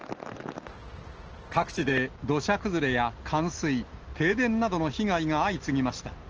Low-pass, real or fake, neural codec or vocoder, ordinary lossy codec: 7.2 kHz; real; none; Opus, 16 kbps